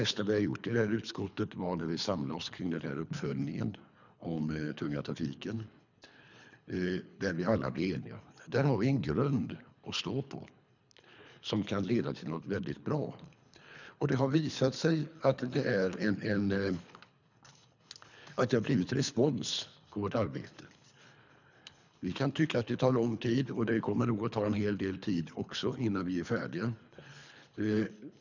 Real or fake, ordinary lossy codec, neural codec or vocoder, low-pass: fake; none; codec, 24 kHz, 3 kbps, HILCodec; 7.2 kHz